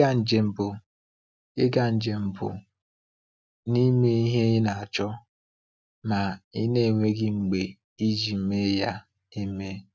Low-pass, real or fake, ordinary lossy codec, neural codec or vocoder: none; real; none; none